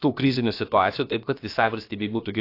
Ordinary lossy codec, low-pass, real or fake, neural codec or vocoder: AAC, 32 kbps; 5.4 kHz; fake; codec, 16 kHz, about 1 kbps, DyCAST, with the encoder's durations